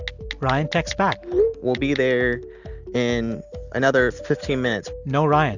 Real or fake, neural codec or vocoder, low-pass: real; none; 7.2 kHz